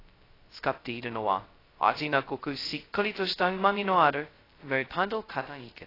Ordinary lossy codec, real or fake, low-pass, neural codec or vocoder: AAC, 24 kbps; fake; 5.4 kHz; codec, 16 kHz, 0.2 kbps, FocalCodec